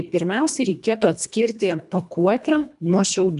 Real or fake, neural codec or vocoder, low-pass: fake; codec, 24 kHz, 1.5 kbps, HILCodec; 10.8 kHz